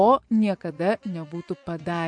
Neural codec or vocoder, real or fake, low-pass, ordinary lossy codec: vocoder, 24 kHz, 100 mel bands, Vocos; fake; 9.9 kHz; MP3, 64 kbps